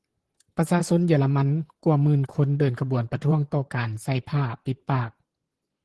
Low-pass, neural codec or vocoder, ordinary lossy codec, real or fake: 10.8 kHz; vocoder, 44.1 kHz, 128 mel bands, Pupu-Vocoder; Opus, 16 kbps; fake